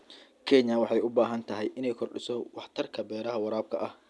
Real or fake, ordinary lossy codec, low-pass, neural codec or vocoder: real; none; none; none